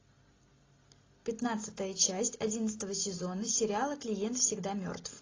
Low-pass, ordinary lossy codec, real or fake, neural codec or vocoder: 7.2 kHz; AAC, 32 kbps; real; none